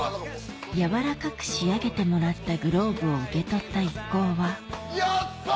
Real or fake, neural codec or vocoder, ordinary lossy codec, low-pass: real; none; none; none